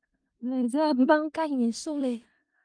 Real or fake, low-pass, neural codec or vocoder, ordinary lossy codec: fake; 9.9 kHz; codec, 16 kHz in and 24 kHz out, 0.4 kbps, LongCat-Audio-Codec, four codebook decoder; Opus, 32 kbps